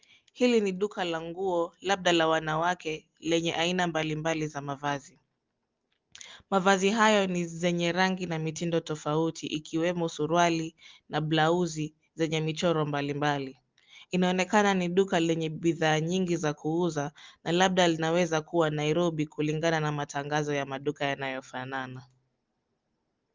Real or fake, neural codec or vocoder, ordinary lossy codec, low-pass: real; none; Opus, 24 kbps; 7.2 kHz